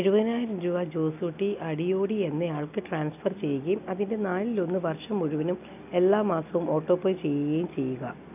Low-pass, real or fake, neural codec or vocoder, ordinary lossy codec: 3.6 kHz; real; none; none